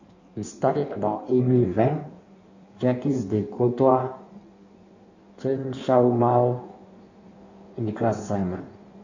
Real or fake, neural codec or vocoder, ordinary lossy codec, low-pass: fake; codec, 16 kHz in and 24 kHz out, 1.1 kbps, FireRedTTS-2 codec; none; 7.2 kHz